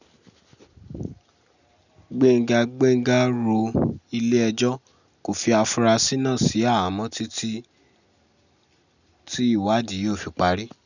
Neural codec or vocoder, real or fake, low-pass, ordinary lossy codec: none; real; 7.2 kHz; none